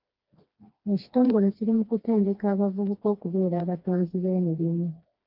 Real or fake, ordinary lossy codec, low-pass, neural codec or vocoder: fake; Opus, 32 kbps; 5.4 kHz; codec, 16 kHz, 2 kbps, FreqCodec, smaller model